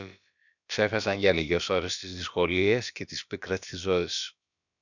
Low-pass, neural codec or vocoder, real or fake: 7.2 kHz; codec, 16 kHz, about 1 kbps, DyCAST, with the encoder's durations; fake